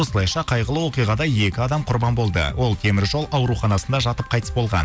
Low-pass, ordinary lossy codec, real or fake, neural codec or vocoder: none; none; real; none